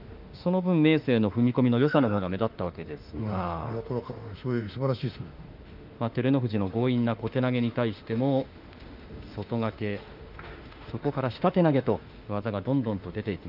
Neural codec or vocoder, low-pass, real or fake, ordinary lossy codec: autoencoder, 48 kHz, 32 numbers a frame, DAC-VAE, trained on Japanese speech; 5.4 kHz; fake; Opus, 32 kbps